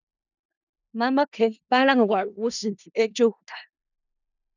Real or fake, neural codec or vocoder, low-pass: fake; codec, 16 kHz in and 24 kHz out, 0.4 kbps, LongCat-Audio-Codec, four codebook decoder; 7.2 kHz